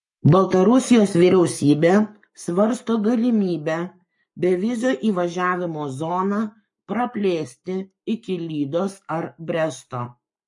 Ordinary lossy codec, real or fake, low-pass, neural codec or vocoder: MP3, 48 kbps; fake; 10.8 kHz; codec, 44.1 kHz, 7.8 kbps, Pupu-Codec